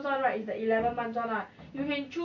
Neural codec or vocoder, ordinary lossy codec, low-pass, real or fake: none; none; 7.2 kHz; real